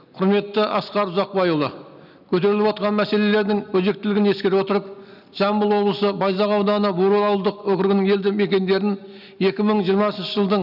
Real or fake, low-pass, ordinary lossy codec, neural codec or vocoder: real; 5.4 kHz; none; none